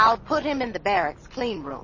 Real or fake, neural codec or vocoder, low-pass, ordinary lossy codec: real; none; 7.2 kHz; MP3, 32 kbps